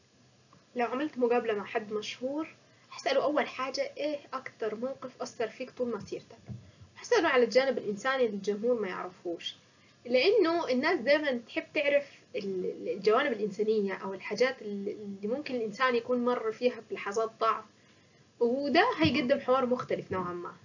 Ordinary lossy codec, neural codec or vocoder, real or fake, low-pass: none; none; real; 7.2 kHz